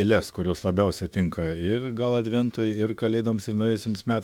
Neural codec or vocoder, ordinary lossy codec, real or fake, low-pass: autoencoder, 48 kHz, 32 numbers a frame, DAC-VAE, trained on Japanese speech; MP3, 96 kbps; fake; 19.8 kHz